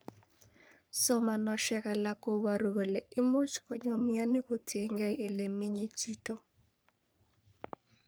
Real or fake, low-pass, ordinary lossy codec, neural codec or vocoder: fake; none; none; codec, 44.1 kHz, 3.4 kbps, Pupu-Codec